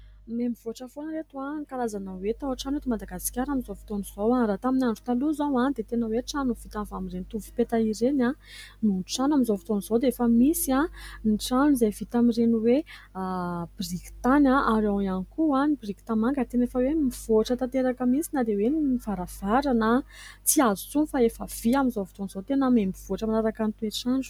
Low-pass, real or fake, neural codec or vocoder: 19.8 kHz; real; none